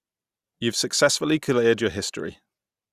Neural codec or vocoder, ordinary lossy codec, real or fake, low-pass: none; Opus, 64 kbps; real; 14.4 kHz